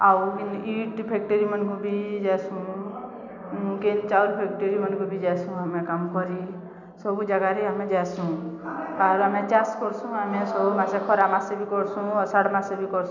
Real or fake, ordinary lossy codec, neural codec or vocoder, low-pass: real; none; none; 7.2 kHz